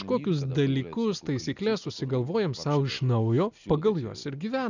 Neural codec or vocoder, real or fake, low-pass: none; real; 7.2 kHz